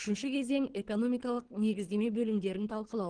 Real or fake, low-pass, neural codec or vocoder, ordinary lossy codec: fake; 9.9 kHz; codec, 24 kHz, 3 kbps, HILCodec; Opus, 16 kbps